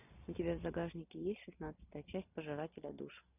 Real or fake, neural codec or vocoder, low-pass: real; none; 3.6 kHz